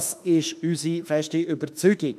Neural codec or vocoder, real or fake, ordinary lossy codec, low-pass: autoencoder, 48 kHz, 32 numbers a frame, DAC-VAE, trained on Japanese speech; fake; none; 14.4 kHz